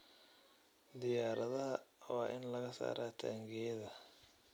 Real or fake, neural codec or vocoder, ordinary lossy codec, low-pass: real; none; none; none